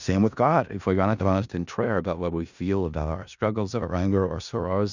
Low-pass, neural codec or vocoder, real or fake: 7.2 kHz; codec, 16 kHz in and 24 kHz out, 0.4 kbps, LongCat-Audio-Codec, four codebook decoder; fake